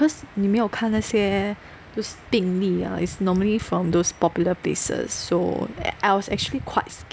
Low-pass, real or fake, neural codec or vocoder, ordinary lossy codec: none; real; none; none